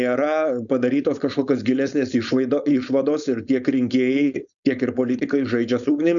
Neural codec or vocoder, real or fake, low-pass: codec, 16 kHz, 4.8 kbps, FACodec; fake; 7.2 kHz